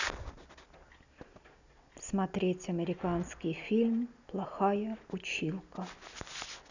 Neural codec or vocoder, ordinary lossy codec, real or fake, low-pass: none; none; real; 7.2 kHz